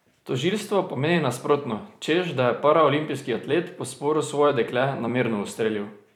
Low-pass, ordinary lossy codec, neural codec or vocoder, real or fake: 19.8 kHz; none; vocoder, 44.1 kHz, 128 mel bands every 256 samples, BigVGAN v2; fake